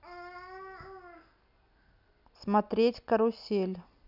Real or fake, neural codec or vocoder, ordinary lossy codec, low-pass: real; none; none; 5.4 kHz